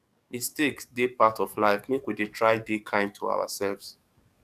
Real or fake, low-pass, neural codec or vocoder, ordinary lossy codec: fake; 14.4 kHz; codec, 44.1 kHz, 7.8 kbps, DAC; none